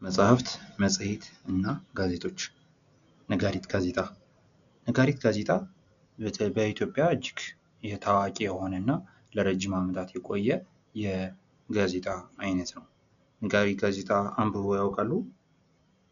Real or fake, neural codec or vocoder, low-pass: real; none; 7.2 kHz